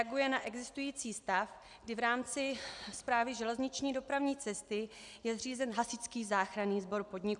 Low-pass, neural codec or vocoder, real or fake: 10.8 kHz; none; real